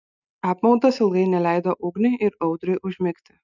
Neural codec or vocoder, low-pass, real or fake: none; 7.2 kHz; real